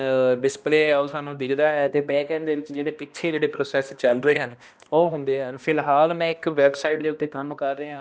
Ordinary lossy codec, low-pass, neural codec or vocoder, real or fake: none; none; codec, 16 kHz, 1 kbps, X-Codec, HuBERT features, trained on balanced general audio; fake